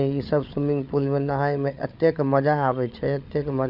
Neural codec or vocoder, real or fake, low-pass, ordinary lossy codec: codec, 16 kHz, 8 kbps, FreqCodec, larger model; fake; 5.4 kHz; none